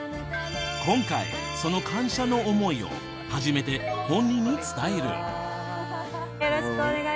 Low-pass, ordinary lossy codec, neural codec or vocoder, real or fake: none; none; none; real